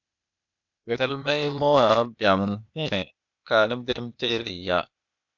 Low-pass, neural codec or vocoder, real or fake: 7.2 kHz; codec, 16 kHz, 0.8 kbps, ZipCodec; fake